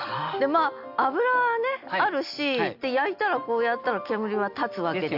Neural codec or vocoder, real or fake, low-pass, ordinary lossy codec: none; real; 5.4 kHz; AAC, 48 kbps